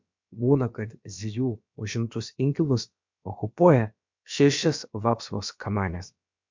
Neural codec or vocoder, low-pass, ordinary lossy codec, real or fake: codec, 16 kHz, about 1 kbps, DyCAST, with the encoder's durations; 7.2 kHz; MP3, 64 kbps; fake